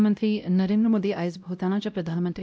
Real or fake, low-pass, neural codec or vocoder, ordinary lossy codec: fake; none; codec, 16 kHz, 0.5 kbps, X-Codec, WavLM features, trained on Multilingual LibriSpeech; none